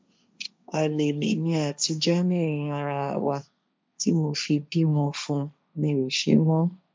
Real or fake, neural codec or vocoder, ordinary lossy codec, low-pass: fake; codec, 16 kHz, 1.1 kbps, Voila-Tokenizer; none; none